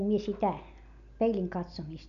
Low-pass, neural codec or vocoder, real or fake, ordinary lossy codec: 7.2 kHz; none; real; none